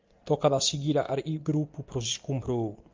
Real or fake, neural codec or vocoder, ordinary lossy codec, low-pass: real; none; Opus, 32 kbps; 7.2 kHz